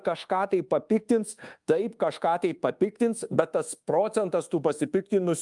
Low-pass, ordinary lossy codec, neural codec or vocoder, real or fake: 10.8 kHz; Opus, 32 kbps; codec, 24 kHz, 1.2 kbps, DualCodec; fake